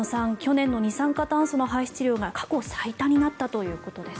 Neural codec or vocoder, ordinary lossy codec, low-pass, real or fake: none; none; none; real